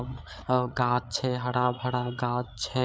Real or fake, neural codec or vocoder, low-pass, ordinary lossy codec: fake; codec, 16 kHz, 8 kbps, FreqCodec, larger model; none; none